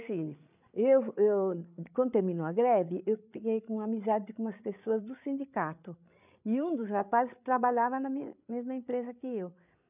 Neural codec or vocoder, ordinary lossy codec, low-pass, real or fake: codec, 16 kHz, 8 kbps, FreqCodec, larger model; none; 3.6 kHz; fake